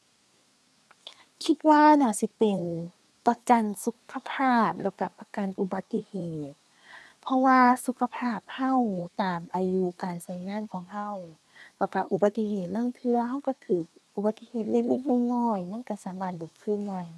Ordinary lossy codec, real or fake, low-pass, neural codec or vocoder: none; fake; none; codec, 24 kHz, 1 kbps, SNAC